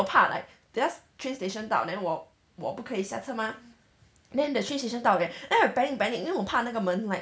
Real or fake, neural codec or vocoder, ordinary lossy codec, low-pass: real; none; none; none